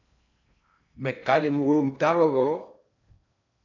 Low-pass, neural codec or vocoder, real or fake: 7.2 kHz; codec, 16 kHz in and 24 kHz out, 0.6 kbps, FocalCodec, streaming, 4096 codes; fake